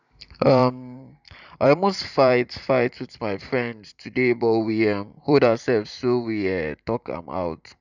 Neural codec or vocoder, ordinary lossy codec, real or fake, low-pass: vocoder, 44.1 kHz, 128 mel bands every 512 samples, BigVGAN v2; none; fake; 7.2 kHz